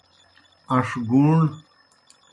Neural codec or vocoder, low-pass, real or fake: none; 10.8 kHz; real